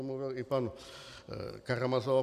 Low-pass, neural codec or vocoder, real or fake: 14.4 kHz; none; real